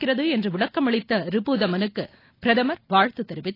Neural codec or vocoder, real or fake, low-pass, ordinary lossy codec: none; real; 5.4 kHz; AAC, 24 kbps